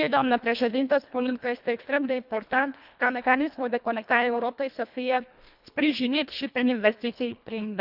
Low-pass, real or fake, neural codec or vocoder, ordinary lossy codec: 5.4 kHz; fake; codec, 24 kHz, 1.5 kbps, HILCodec; none